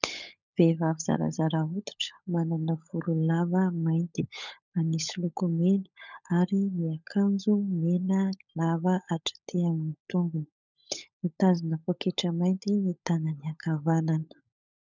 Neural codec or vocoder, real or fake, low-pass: codec, 16 kHz, 16 kbps, FunCodec, trained on LibriTTS, 50 frames a second; fake; 7.2 kHz